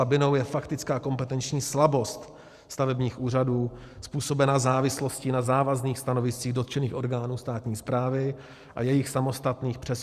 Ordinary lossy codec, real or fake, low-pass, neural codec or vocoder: Opus, 64 kbps; fake; 14.4 kHz; vocoder, 44.1 kHz, 128 mel bands every 512 samples, BigVGAN v2